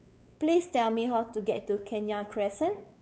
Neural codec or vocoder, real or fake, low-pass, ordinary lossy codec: codec, 16 kHz, 4 kbps, X-Codec, WavLM features, trained on Multilingual LibriSpeech; fake; none; none